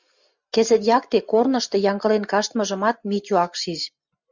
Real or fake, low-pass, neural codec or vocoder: real; 7.2 kHz; none